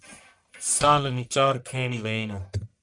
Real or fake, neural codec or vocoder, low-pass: fake; codec, 44.1 kHz, 1.7 kbps, Pupu-Codec; 10.8 kHz